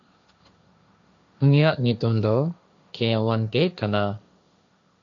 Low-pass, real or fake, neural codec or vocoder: 7.2 kHz; fake; codec, 16 kHz, 1.1 kbps, Voila-Tokenizer